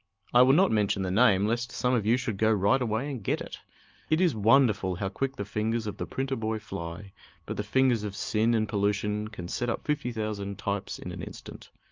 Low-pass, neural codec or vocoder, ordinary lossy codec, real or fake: 7.2 kHz; none; Opus, 24 kbps; real